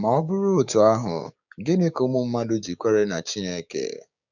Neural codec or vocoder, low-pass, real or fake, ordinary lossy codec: codec, 16 kHz, 6 kbps, DAC; 7.2 kHz; fake; none